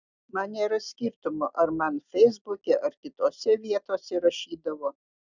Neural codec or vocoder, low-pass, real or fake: none; 7.2 kHz; real